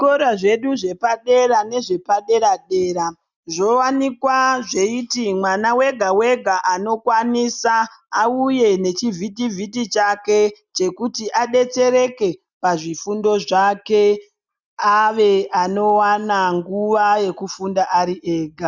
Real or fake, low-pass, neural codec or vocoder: real; 7.2 kHz; none